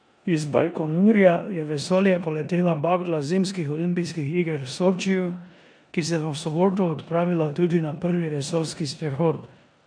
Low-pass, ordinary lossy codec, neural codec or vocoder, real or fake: 9.9 kHz; none; codec, 16 kHz in and 24 kHz out, 0.9 kbps, LongCat-Audio-Codec, four codebook decoder; fake